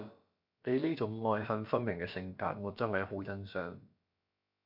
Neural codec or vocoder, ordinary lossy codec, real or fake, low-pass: codec, 16 kHz, about 1 kbps, DyCAST, with the encoder's durations; MP3, 48 kbps; fake; 5.4 kHz